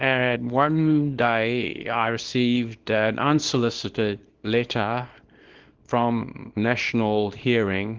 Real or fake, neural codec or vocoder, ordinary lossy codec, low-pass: fake; codec, 24 kHz, 0.9 kbps, WavTokenizer, small release; Opus, 16 kbps; 7.2 kHz